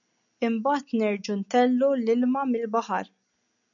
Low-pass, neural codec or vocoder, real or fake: 7.2 kHz; none; real